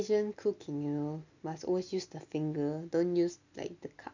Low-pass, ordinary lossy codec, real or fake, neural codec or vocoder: 7.2 kHz; none; real; none